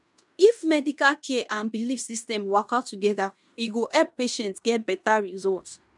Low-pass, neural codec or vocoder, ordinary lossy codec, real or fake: 10.8 kHz; codec, 16 kHz in and 24 kHz out, 0.9 kbps, LongCat-Audio-Codec, fine tuned four codebook decoder; none; fake